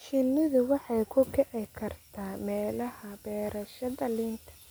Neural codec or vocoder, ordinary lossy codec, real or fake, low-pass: none; none; real; none